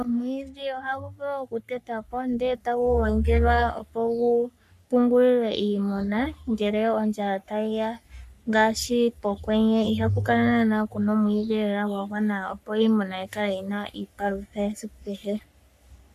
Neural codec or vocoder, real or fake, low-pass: codec, 44.1 kHz, 3.4 kbps, Pupu-Codec; fake; 14.4 kHz